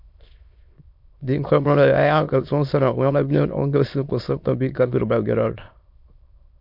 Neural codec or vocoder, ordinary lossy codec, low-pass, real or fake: autoencoder, 22.05 kHz, a latent of 192 numbers a frame, VITS, trained on many speakers; MP3, 48 kbps; 5.4 kHz; fake